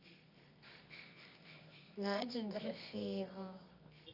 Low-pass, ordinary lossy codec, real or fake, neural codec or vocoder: 5.4 kHz; none; fake; codec, 24 kHz, 0.9 kbps, WavTokenizer, medium music audio release